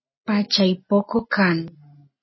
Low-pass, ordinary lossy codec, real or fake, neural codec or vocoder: 7.2 kHz; MP3, 24 kbps; real; none